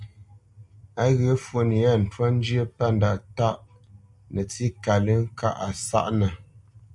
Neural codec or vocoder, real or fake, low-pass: none; real; 10.8 kHz